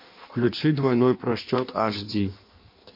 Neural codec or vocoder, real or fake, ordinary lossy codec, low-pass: codec, 16 kHz in and 24 kHz out, 1.1 kbps, FireRedTTS-2 codec; fake; MP3, 32 kbps; 5.4 kHz